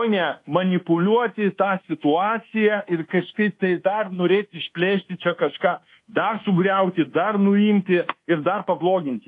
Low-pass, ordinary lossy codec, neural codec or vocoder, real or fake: 10.8 kHz; AAC, 48 kbps; codec, 24 kHz, 1.2 kbps, DualCodec; fake